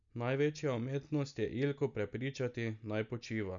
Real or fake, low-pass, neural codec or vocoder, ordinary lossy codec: real; 7.2 kHz; none; none